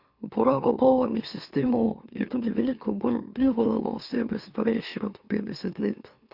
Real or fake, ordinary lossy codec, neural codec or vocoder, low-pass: fake; Opus, 64 kbps; autoencoder, 44.1 kHz, a latent of 192 numbers a frame, MeloTTS; 5.4 kHz